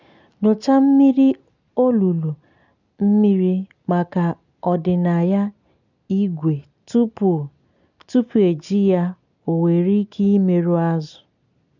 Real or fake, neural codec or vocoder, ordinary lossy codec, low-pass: real; none; none; 7.2 kHz